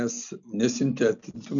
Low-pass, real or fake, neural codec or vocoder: 7.2 kHz; real; none